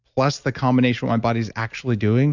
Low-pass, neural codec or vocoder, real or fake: 7.2 kHz; none; real